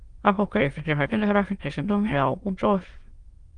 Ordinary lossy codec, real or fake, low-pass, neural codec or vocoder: Opus, 24 kbps; fake; 9.9 kHz; autoencoder, 22.05 kHz, a latent of 192 numbers a frame, VITS, trained on many speakers